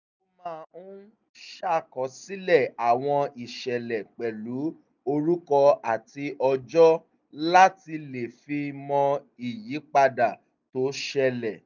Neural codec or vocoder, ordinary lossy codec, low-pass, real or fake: none; none; 7.2 kHz; real